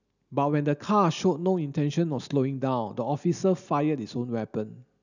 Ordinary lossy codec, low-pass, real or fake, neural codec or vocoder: none; 7.2 kHz; real; none